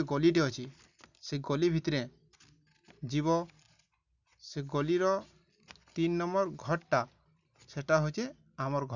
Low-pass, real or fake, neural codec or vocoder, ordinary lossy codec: 7.2 kHz; real; none; none